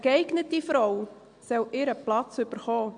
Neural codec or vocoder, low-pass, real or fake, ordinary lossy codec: none; 9.9 kHz; real; MP3, 64 kbps